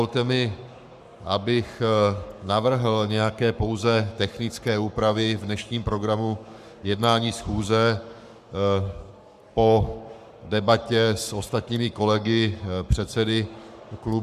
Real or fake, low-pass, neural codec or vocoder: fake; 14.4 kHz; codec, 44.1 kHz, 7.8 kbps, Pupu-Codec